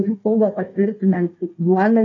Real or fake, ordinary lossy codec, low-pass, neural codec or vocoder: fake; AAC, 32 kbps; 7.2 kHz; codec, 16 kHz, 1 kbps, FunCodec, trained on Chinese and English, 50 frames a second